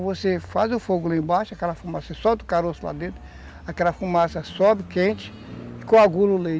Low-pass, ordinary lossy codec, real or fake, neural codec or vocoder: none; none; real; none